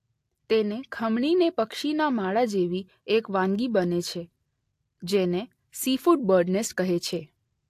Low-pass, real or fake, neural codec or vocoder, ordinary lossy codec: 14.4 kHz; real; none; AAC, 64 kbps